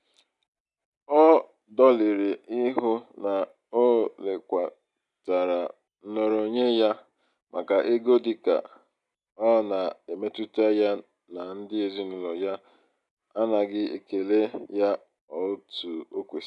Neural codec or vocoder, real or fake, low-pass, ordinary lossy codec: none; real; 10.8 kHz; none